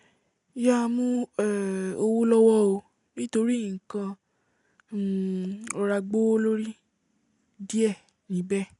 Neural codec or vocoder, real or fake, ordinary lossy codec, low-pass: none; real; none; 10.8 kHz